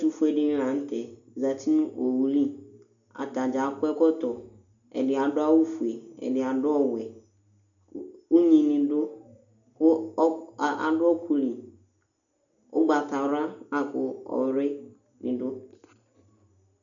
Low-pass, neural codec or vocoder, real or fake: 7.2 kHz; none; real